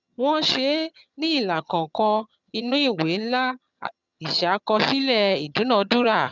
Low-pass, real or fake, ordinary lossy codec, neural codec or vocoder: 7.2 kHz; fake; none; vocoder, 22.05 kHz, 80 mel bands, HiFi-GAN